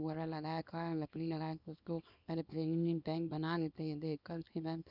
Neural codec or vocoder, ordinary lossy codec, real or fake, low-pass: codec, 24 kHz, 0.9 kbps, WavTokenizer, small release; none; fake; 5.4 kHz